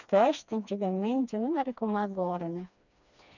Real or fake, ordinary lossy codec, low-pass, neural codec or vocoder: fake; none; 7.2 kHz; codec, 16 kHz, 2 kbps, FreqCodec, smaller model